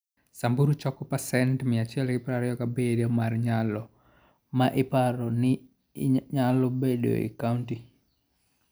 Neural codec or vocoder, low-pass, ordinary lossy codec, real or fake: none; none; none; real